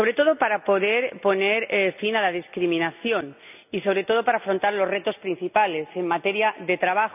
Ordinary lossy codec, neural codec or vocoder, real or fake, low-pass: none; none; real; 3.6 kHz